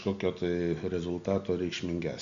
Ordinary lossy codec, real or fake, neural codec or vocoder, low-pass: MP3, 64 kbps; real; none; 7.2 kHz